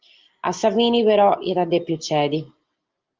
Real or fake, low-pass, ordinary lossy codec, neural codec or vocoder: real; 7.2 kHz; Opus, 32 kbps; none